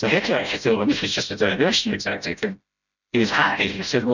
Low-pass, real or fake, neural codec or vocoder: 7.2 kHz; fake; codec, 16 kHz, 0.5 kbps, FreqCodec, smaller model